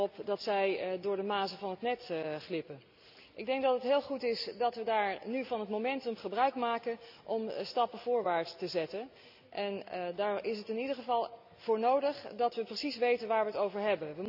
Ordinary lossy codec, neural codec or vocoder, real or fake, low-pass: none; none; real; 5.4 kHz